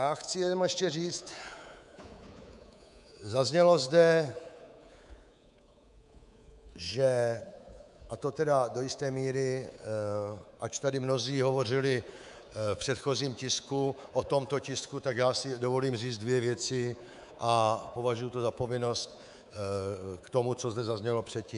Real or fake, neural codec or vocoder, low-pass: fake; codec, 24 kHz, 3.1 kbps, DualCodec; 10.8 kHz